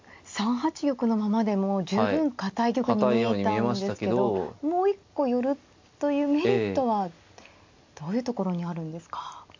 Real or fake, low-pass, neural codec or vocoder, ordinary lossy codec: real; 7.2 kHz; none; MP3, 48 kbps